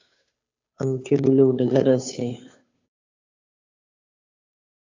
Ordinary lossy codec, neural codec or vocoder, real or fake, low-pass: AAC, 48 kbps; codec, 16 kHz, 2 kbps, FunCodec, trained on Chinese and English, 25 frames a second; fake; 7.2 kHz